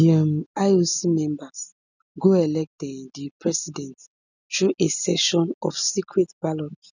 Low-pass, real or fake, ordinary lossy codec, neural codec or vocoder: 7.2 kHz; real; none; none